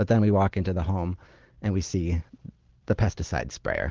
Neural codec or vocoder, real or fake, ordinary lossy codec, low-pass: none; real; Opus, 16 kbps; 7.2 kHz